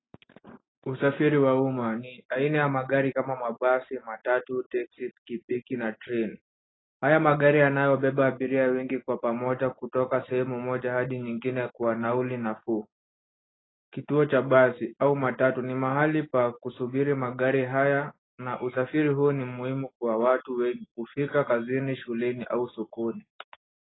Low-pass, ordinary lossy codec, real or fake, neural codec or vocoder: 7.2 kHz; AAC, 16 kbps; real; none